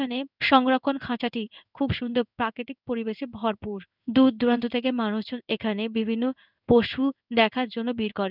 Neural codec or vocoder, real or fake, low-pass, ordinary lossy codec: codec, 16 kHz in and 24 kHz out, 1 kbps, XY-Tokenizer; fake; 5.4 kHz; none